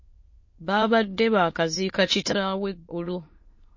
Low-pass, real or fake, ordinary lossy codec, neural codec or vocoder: 7.2 kHz; fake; MP3, 32 kbps; autoencoder, 22.05 kHz, a latent of 192 numbers a frame, VITS, trained on many speakers